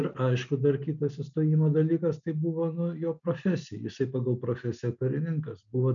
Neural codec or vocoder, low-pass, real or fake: none; 7.2 kHz; real